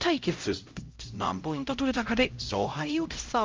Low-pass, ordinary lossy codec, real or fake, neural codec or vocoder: 7.2 kHz; Opus, 32 kbps; fake; codec, 16 kHz, 0.5 kbps, X-Codec, HuBERT features, trained on LibriSpeech